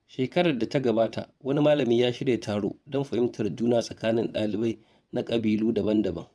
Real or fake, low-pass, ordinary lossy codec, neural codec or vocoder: fake; none; none; vocoder, 22.05 kHz, 80 mel bands, WaveNeXt